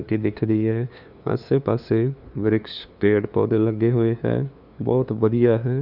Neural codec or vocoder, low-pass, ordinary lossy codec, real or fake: codec, 16 kHz, 2 kbps, FunCodec, trained on LibriTTS, 25 frames a second; 5.4 kHz; none; fake